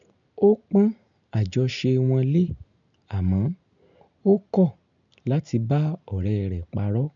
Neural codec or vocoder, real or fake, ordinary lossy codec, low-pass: none; real; none; 7.2 kHz